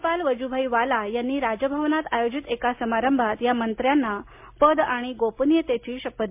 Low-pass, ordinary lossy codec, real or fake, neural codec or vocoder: 3.6 kHz; MP3, 32 kbps; real; none